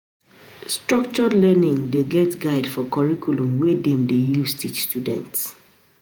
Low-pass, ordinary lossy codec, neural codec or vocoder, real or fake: none; none; vocoder, 48 kHz, 128 mel bands, Vocos; fake